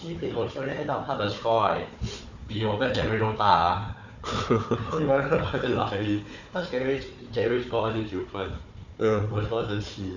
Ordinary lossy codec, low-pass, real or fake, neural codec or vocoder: none; 7.2 kHz; fake; codec, 16 kHz, 4 kbps, FunCodec, trained on Chinese and English, 50 frames a second